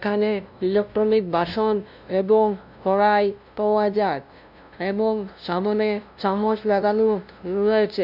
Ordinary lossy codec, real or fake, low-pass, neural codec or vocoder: none; fake; 5.4 kHz; codec, 16 kHz, 0.5 kbps, FunCodec, trained on LibriTTS, 25 frames a second